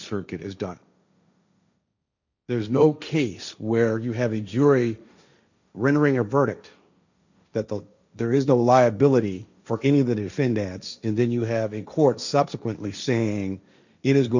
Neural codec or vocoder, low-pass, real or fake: codec, 16 kHz, 1.1 kbps, Voila-Tokenizer; 7.2 kHz; fake